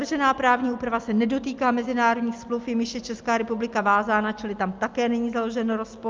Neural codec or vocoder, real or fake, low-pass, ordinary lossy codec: none; real; 7.2 kHz; Opus, 24 kbps